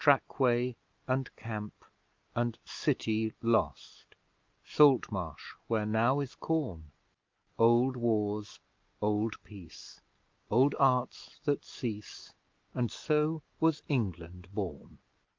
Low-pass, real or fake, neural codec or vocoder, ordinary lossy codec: 7.2 kHz; real; none; Opus, 24 kbps